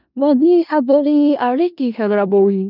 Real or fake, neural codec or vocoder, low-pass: fake; codec, 16 kHz in and 24 kHz out, 0.4 kbps, LongCat-Audio-Codec, four codebook decoder; 5.4 kHz